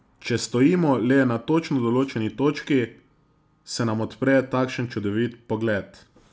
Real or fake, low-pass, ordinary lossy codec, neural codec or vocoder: real; none; none; none